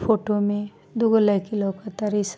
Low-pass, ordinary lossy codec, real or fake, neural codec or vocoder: none; none; real; none